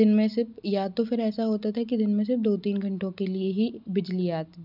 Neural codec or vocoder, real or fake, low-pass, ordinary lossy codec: none; real; 5.4 kHz; none